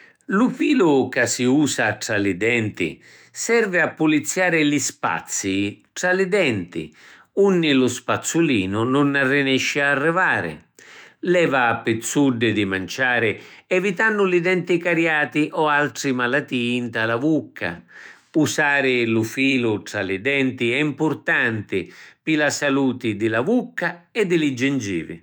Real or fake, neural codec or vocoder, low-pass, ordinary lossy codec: fake; autoencoder, 48 kHz, 128 numbers a frame, DAC-VAE, trained on Japanese speech; none; none